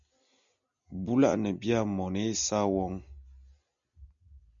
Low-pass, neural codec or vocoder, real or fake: 7.2 kHz; none; real